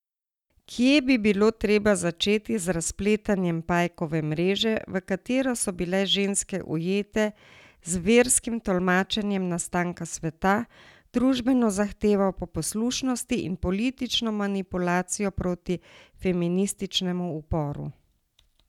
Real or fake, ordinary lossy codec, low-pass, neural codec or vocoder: real; none; 19.8 kHz; none